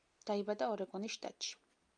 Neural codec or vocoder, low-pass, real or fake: none; 9.9 kHz; real